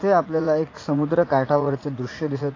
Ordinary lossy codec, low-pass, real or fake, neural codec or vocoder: AAC, 32 kbps; 7.2 kHz; fake; vocoder, 44.1 kHz, 80 mel bands, Vocos